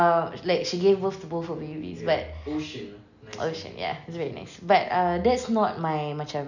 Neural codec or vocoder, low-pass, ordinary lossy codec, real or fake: none; 7.2 kHz; none; real